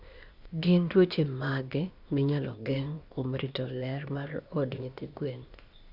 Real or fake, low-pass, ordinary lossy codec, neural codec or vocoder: fake; 5.4 kHz; Opus, 64 kbps; codec, 16 kHz, 0.8 kbps, ZipCodec